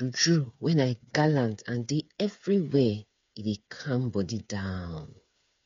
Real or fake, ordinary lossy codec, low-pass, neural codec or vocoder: fake; MP3, 48 kbps; 7.2 kHz; codec, 16 kHz, 8 kbps, FreqCodec, smaller model